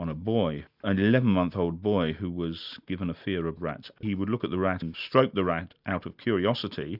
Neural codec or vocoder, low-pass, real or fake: none; 5.4 kHz; real